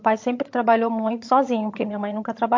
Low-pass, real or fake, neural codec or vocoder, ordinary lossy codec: 7.2 kHz; fake; vocoder, 22.05 kHz, 80 mel bands, HiFi-GAN; MP3, 48 kbps